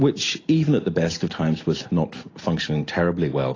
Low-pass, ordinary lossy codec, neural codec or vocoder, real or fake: 7.2 kHz; AAC, 32 kbps; none; real